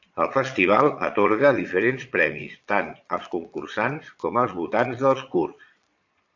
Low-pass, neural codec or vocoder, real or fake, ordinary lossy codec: 7.2 kHz; vocoder, 22.05 kHz, 80 mel bands, Vocos; fake; AAC, 48 kbps